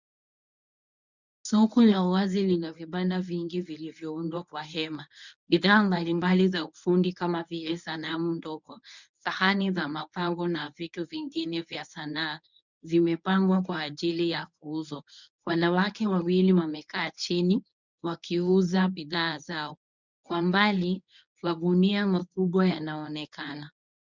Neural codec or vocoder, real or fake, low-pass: codec, 24 kHz, 0.9 kbps, WavTokenizer, medium speech release version 1; fake; 7.2 kHz